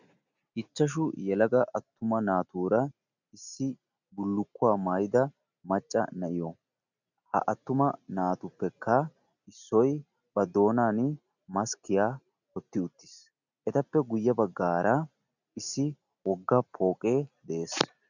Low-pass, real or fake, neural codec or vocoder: 7.2 kHz; real; none